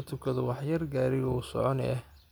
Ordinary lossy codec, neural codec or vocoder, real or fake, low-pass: none; none; real; none